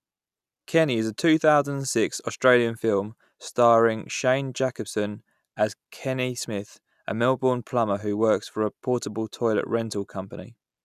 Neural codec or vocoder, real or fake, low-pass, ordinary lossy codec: none; real; 14.4 kHz; none